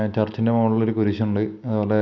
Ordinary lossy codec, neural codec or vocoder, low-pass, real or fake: none; none; 7.2 kHz; real